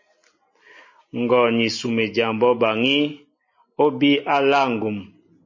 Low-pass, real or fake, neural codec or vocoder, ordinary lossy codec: 7.2 kHz; real; none; MP3, 32 kbps